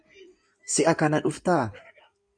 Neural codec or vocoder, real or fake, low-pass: codec, 16 kHz in and 24 kHz out, 2.2 kbps, FireRedTTS-2 codec; fake; 9.9 kHz